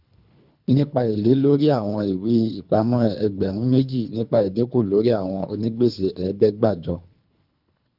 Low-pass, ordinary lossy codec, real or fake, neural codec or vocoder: 5.4 kHz; none; fake; codec, 24 kHz, 3 kbps, HILCodec